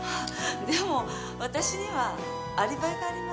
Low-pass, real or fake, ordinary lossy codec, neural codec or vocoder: none; real; none; none